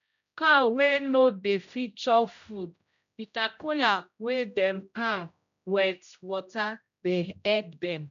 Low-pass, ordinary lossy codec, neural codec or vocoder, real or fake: 7.2 kHz; none; codec, 16 kHz, 0.5 kbps, X-Codec, HuBERT features, trained on general audio; fake